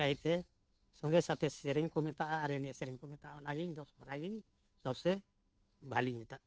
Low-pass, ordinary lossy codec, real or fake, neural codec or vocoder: none; none; fake; codec, 16 kHz, 2 kbps, FunCodec, trained on Chinese and English, 25 frames a second